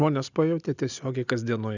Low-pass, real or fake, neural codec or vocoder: 7.2 kHz; real; none